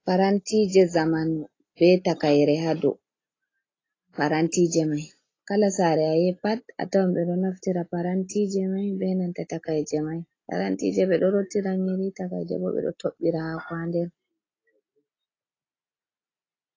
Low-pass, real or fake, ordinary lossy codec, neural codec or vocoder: 7.2 kHz; real; AAC, 32 kbps; none